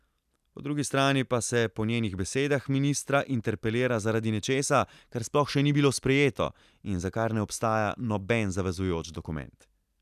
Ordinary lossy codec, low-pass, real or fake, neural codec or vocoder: none; 14.4 kHz; real; none